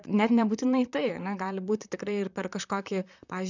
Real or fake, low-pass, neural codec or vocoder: fake; 7.2 kHz; vocoder, 44.1 kHz, 128 mel bands, Pupu-Vocoder